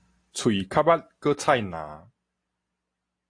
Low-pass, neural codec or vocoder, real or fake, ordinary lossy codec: 9.9 kHz; none; real; AAC, 48 kbps